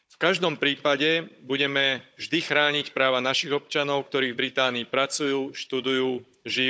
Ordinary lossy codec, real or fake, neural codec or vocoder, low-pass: none; fake; codec, 16 kHz, 16 kbps, FunCodec, trained on Chinese and English, 50 frames a second; none